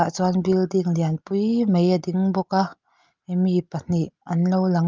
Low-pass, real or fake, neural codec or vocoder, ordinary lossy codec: 7.2 kHz; real; none; Opus, 32 kbps